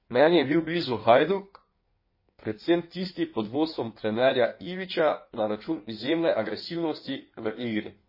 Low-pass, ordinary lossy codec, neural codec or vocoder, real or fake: 5.4 kHz; MP3, 24 kbps; codec, 16 kHz in and 24 kHz out, 1.1 kbps, FireRedTTS-2 codec; fake